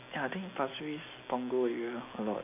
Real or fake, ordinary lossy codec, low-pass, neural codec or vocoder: real; none; 3.6 kHz; none